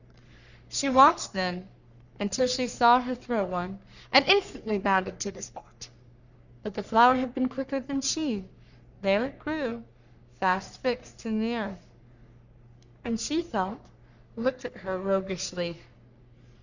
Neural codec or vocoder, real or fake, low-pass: codec, 44.1 kHz, 3.4 kbps, Pupu-Codec; fake; 7.2 kHz